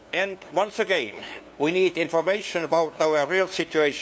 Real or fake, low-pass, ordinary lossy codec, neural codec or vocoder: fake; none; none; codec, 16 kHz, 2 kbps, FunCodec, trained on LibriTTS, 25 frames a second